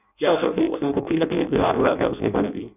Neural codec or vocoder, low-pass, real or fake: codec, 16 kHz in and 24 kHz out, 0.6 kbps, FireRedTTS-2 codec; 3.6 kHz; fake